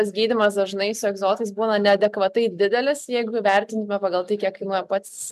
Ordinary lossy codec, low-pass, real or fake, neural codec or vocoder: MP3, 96 kbps; 14.4 kHz; real; none